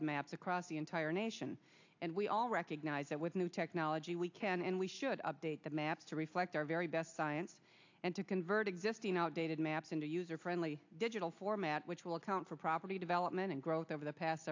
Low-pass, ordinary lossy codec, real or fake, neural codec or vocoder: 7.2 kHz; AAC, 48 kbps; real; none